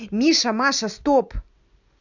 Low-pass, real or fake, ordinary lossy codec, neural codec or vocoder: 7.2 kHz; real; none; none